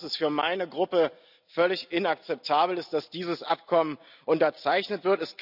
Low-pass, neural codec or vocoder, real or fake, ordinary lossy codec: 5.4 kHz; none; real; none